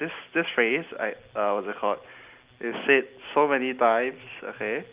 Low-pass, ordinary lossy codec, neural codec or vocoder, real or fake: 3.6 kHz; Opus, 24 kbps; none; real